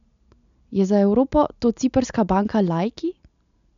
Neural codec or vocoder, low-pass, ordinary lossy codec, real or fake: none; 7.2 kHz; none; real